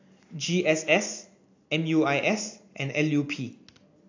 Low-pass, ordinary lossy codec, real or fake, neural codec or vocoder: 7.2 kHz; AAC, 48 kbps; real; none